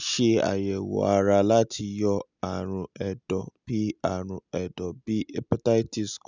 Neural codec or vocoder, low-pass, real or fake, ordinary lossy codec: none; 7.2 kHz; real; none